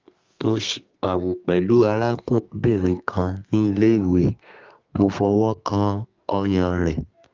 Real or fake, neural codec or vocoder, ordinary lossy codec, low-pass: fake; codec, 32 kHz, 1.9 kbps, SNAC; Opus, 32 kbps; 7.2 kHz